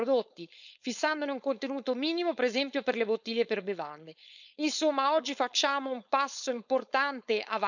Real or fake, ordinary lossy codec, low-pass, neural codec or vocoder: fake; none; 7.2 kHz; codec, 16 kHz, 4.8 kbps, FACodec